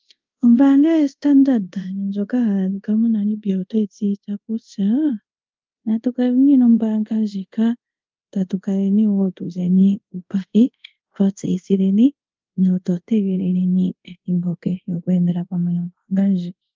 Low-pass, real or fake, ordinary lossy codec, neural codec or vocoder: 7.2 kHz; fake; Opus, 24 kbps; codec, 24 kHz, 0.5 kbps, DualCodec